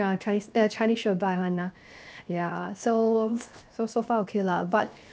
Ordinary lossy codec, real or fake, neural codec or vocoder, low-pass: none; fake; codec, 16 kHz, 0.7 kbps, FocalCodec; none